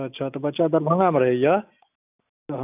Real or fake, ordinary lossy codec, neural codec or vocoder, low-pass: real; none; none; 3.6 kHz